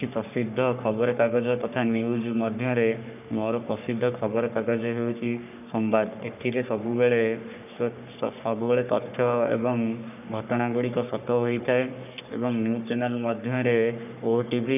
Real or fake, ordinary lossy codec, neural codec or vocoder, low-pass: fake; none; codec, 44.1 kHz, 3.4 kbps, Pupu-Codec; 3.6 kHz